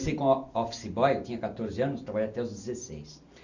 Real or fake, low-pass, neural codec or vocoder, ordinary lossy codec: real; 7.2 kHz; none; none